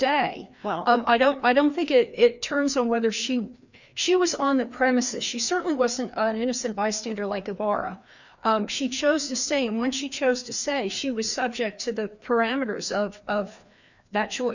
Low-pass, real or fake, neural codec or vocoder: 7.2 kHz; fake; codec, 16 kHz, 2 kbps, FreqCodec, larger model